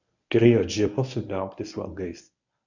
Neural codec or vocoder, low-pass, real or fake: codec, 24 kHz, 0.9 kbps, WavTokenizer, medium speech release version 1; 7.2 kHz; fake